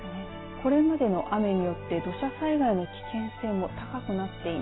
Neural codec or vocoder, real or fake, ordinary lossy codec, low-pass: none; real; AAC, 16 kbps; 7.2 kHz